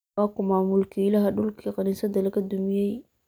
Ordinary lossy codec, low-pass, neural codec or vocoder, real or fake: none; none; none; real